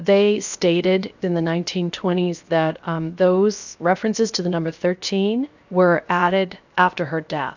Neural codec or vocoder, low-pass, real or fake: codec, 16 kHz, 0.7 kbps, FocalCodec; 7.2 kHz; fake